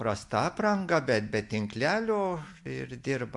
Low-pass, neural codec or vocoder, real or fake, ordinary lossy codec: 10.8 kHz; none; real; MP3, 64 kbps